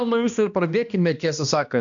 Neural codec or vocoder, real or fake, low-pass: codec, 16 kHz, 1 kbps, X-Codec, HuBERT features, trained on balanced general audio; fake; 7.2 kHz